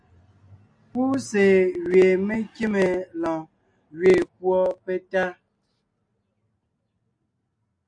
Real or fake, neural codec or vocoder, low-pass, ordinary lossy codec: real; none; 9.9 kHz; AAC, 64 kbps